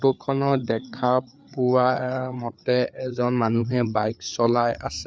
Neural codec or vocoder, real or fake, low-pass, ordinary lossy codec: codec, 16 kHz, 4 kbps, FreqCodec, larger model; fake; none; none